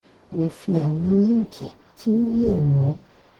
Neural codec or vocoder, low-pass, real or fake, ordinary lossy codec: codec, 44.1 kHz, 0.9 kbps, DAC; 19.8 kHz; fake; Opus, 16 kbps